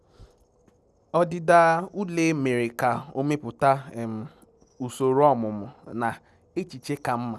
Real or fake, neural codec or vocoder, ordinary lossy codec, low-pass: real; none; none; none